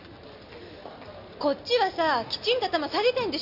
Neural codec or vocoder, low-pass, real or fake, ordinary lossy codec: none; 5.4 kHz; real; none